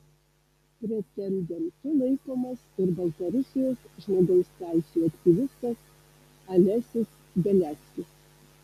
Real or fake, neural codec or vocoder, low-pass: real; none; 14.4 kHz